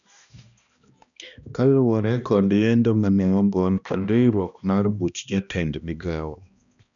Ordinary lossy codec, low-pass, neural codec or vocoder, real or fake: none; 7.2 kHz; codec, 16 kHz, 1 kbps, X-Codec, HuBERT features, trained on balanced general audio; fake